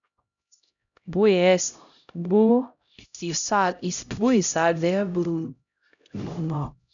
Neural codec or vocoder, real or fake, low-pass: codec, 16 kHz, 0.5 kbps, X-Codec, HuBERT features, trained on LibriSpeech; fake; 7.2 kHz